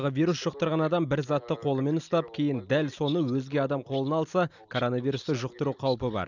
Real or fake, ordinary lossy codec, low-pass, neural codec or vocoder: real; none; 7.2 kHz; none